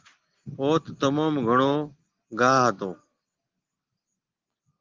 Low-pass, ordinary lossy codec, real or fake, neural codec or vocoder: 7.2 kHz; Opus, 16 kbps; real; none